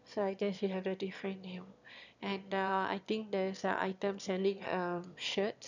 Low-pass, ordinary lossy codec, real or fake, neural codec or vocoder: 7.2 kHz; none; fake; autoencoder, 22.05 kHz, a latent of 192 numbers a frame, VITS, trained on one speaker